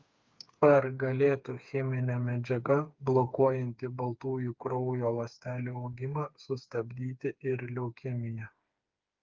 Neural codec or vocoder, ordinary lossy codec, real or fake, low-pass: codec, 16 kHz, 4 kbps, FreqCodec, smaller model; Opus, 24 kbps; fake; 7.2 kHz